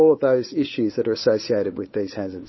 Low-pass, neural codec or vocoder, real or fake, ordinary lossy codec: 7.2 kHz; codec, 16 kHz, 8 kbps, FunCodec, trained on Chinese and English, 25 frames a second; fake; MP3, 24 kbps